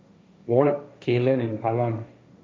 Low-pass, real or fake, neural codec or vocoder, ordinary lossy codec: none; fake; codec, 16 kHz, 1.1 kbps, Voila-Tokenizer; none